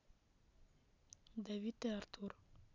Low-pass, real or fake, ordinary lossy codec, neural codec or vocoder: 7.2 kHz; real; none; none